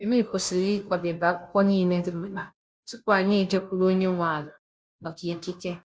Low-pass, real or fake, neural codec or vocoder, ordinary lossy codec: none; fake; codec, 16 kHz, 0.5 kbps, FunCodec, trained on Chinese and English, 25 frames a second; none